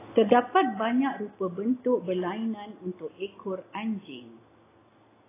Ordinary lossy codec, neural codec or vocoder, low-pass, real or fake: AAC, 16 kbps; none; 3.6 kHz; real